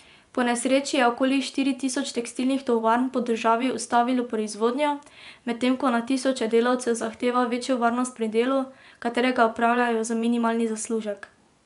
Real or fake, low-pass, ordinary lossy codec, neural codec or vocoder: fake; 10.8 kHz; none; vocoder, 24 kHz, 100 mel bands, Vocos